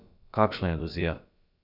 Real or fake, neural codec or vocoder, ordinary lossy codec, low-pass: fake; codec, 16 kHz, about 1 kbps, DyCAST, with the encoder's durations; none; 5.4 kHz